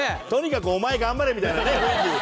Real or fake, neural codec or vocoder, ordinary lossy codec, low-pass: real; none; none; none